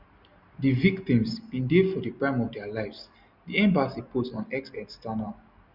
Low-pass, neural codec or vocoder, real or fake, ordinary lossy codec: 5.4 kHz; none; real; Opus, 64 kbps